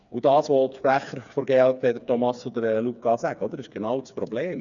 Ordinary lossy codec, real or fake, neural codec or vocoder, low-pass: none; fake; codec, 16 kHz, 4 kbps, FreqCodec, smaller model; 7.2 kHz